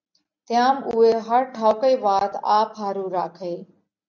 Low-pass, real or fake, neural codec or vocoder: 7.2 kHz; real; none